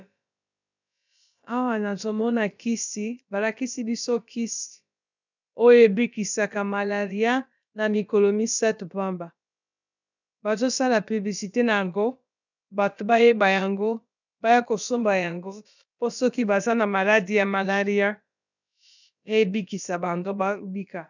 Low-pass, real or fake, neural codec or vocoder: 7.2 kHz; fake; codec, 16 kHz, about 1 kbps, DyCAST, with the encoder's durations